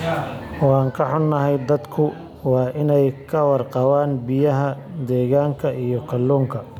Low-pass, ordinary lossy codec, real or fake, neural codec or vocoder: 19.8 kHz; none; real; none